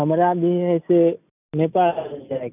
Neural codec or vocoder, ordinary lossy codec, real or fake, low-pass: none; none; real; 3.6 kHz